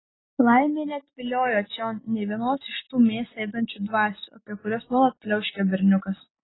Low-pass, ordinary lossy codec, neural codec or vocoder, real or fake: 7.2 kHz; AAC, 16 kbps; none; real